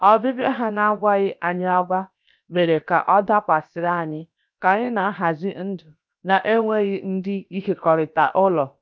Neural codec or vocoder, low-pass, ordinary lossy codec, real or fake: codec, 16 kHz, about 1 kbps, DyCAST, with the encoder's durations; none; none; fake